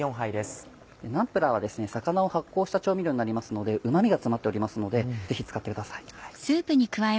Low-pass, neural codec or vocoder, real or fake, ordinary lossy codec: none; none; real; none